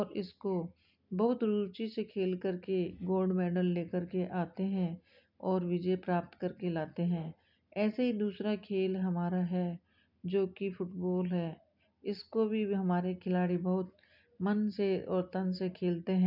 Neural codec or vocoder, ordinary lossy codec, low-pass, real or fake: none; none; 5.4 kHz; real